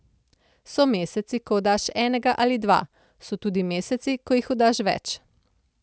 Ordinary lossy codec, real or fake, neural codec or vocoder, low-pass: none; real; none; none